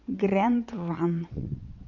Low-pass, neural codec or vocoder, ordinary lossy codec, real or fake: 7.2 kHz; vocoder, 22.05 kHz, 80 mel bands, WaveNeXt; MP3, 48 kbps; fake